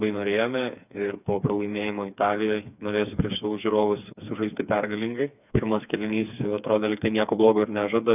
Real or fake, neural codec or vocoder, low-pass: fake; codec, 16 kHz, 4 kbps, FreqCodec, smaller model; 3.6 kHz